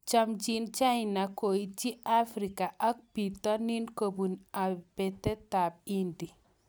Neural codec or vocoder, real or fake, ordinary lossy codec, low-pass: none; real; none; none